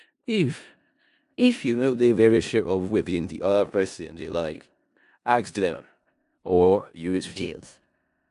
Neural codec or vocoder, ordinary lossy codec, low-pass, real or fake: codec, 16 kHz in and 24 kHz out, 0.4 kbps, LongCat-Audio-Codec, four codebook decoder; AAC, 96 kbps; 10.8 kHz; fake